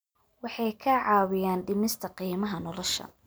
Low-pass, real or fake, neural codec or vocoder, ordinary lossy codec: none; real; none; none